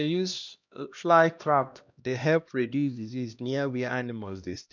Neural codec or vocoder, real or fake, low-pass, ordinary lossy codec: codec, 16 kHz, 1 kbps, X-Codec, HuBERT features, trained on LibriSpeech; fake; 7.2 kHz; none